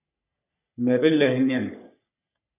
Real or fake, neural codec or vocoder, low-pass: fake; codec, 44.1 kHz, 3.4 kbps, Pupu-Codec; 3.6 kHz